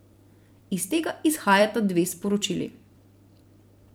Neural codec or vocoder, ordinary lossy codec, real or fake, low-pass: vocoder, 44.1 kHz, 128 mel bands every 256 samples, BigVGAN v2; none; fake; none